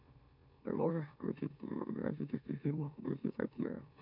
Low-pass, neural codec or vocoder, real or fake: 5.4 kHz; autoencoder, 44.1 kHz, a latent of 192 numbers a frame, MeloTTS; fake